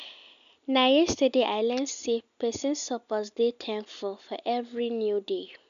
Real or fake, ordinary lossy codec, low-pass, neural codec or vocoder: real; none; 7.2 kHz; none